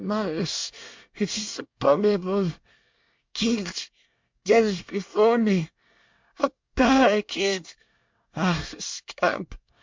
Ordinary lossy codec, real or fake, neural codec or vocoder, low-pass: MP3, 64 kbps; fake; codec, 24 kHz, 1 kbps, SNAC; 7.2 kHz